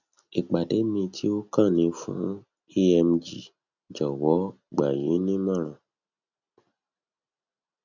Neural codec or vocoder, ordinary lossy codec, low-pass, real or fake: none; none; 7.2 kHz; real